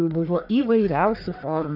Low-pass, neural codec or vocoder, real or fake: 5.4 kHz; codec, 44.1 kHz, 1.7 kbps, Pupu-Codec; fake